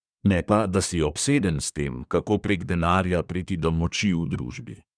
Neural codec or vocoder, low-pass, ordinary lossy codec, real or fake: codec, 24 kHz, 1 kbps, SNAC; 9.9 kHz; none; fake